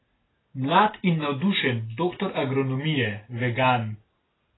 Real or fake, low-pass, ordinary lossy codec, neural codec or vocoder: real; 7.2 kHz; AAC, 16 kbps; none